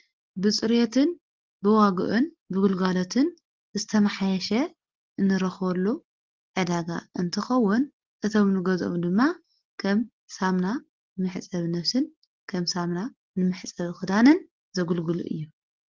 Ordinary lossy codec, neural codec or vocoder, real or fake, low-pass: Opus, 16 kbps; none; real; 7.2 kHz